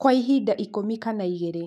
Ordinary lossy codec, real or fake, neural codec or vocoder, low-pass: none; fake; autoencoder, 48 kHz, 128 numbers a frame, DAC-VAE, trained on Japanese speech; 14.4 kHz